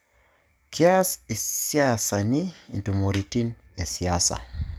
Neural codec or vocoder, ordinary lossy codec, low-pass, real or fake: codec, 44.1 kHz, 7.8 kbps, DAC; none; none; fake